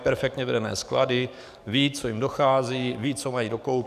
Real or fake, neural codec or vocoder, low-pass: fake; codec, 44.1 kHz, 7.8 kbps, DAC; 14.4 kHz